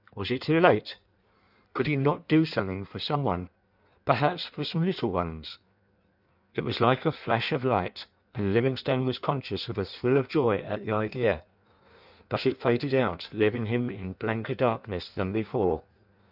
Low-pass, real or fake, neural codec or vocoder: 5.4 kHz; fake; codec, 16 kHz in and 24 kHz out, 1.1 kbps, FireRedTTS-2 codec